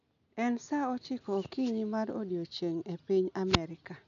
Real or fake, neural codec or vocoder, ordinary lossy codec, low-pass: real; none; none; 7.2 kHz